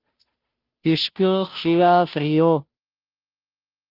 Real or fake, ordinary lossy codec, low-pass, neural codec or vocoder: fake; Opus, 24 kbps; 5.4 kHz; codec, 16 kHz, 0.5 kbps, FunCodec, trained on Chinese and English, 25 frames a second